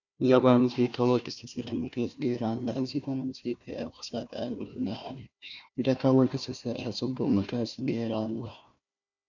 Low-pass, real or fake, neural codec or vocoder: 7.2 kHz; fake; codec, 16 kHz, 1 kbps, FunCodec, trained on Chinese and English, 50 frames a second